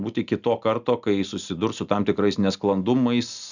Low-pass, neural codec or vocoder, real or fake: 7.2 kHz; none; real